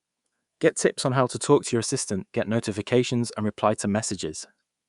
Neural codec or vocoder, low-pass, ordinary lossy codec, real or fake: codec, 24 kHz, 3.1 kbps, DualCodec; 10.8 kHz; Opus, 64 kbps; fake